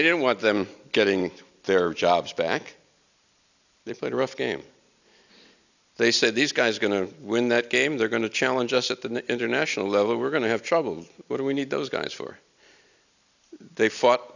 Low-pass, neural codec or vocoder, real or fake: 7.2 kHz; none; real